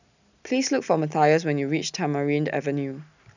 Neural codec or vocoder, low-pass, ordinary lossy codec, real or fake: none; 7.2 kHz; none; real